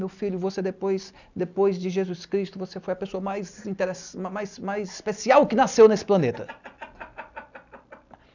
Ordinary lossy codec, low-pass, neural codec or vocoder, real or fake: none; 7.2 kHz; none; real